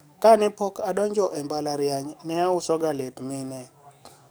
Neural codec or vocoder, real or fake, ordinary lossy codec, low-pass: codec, 44.1 kHz, 7.8 kbps, DAC; fake; none; none